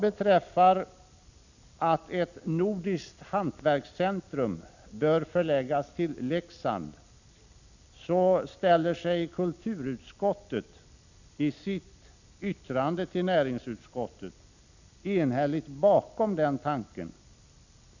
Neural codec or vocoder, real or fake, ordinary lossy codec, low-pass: none; real; none; 7.2 kHz